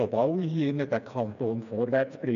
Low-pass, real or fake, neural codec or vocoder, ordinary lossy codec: 7.2 kHz; fake; codec, 16 kHz, 2 kbps, FreqCodec, smaller model; none